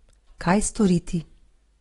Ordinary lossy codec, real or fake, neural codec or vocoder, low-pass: AAC, 32 kbps; real; none; 10.8 kHz